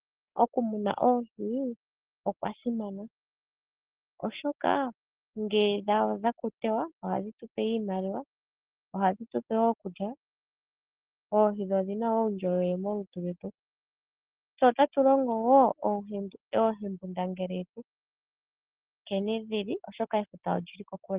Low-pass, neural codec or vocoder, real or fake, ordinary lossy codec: 3.6 kHz; none; real; Opus, 16 kbps